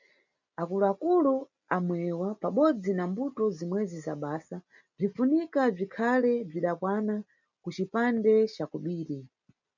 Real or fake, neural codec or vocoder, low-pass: real; none; 7.2 kHz